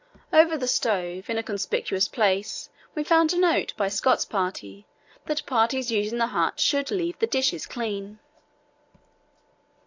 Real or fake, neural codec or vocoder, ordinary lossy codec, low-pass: real; none; AAC, 48 kbps; 7.2 kHz